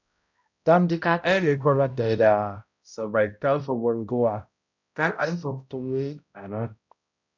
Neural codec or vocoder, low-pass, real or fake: codec, 16 kHz, 0.5 kbps, X-Codec, HuBERT features, trained on balanced general audio; 7.2 kHz; fake